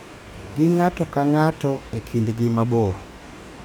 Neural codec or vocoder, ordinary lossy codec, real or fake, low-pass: codec, 44.1 kHz, 2.6 kbps, DAC; none; fake; 19.8 kHz